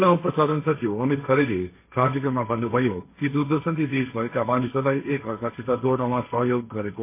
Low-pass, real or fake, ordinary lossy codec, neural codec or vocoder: 3.6 kHz; fake; MP3, 24 kbps; codec, 16 kHz, 1.1 kbps, Voila-Tokenizer